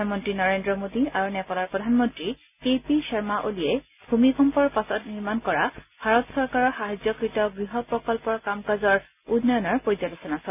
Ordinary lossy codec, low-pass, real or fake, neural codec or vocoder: none; 3.6 kHz; real; none